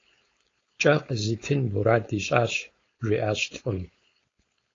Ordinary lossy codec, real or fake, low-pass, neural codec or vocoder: AAC, 32 kbps; fake; 7.2 kHz; codec, 16 kHz, 4.8 kbps, FACodec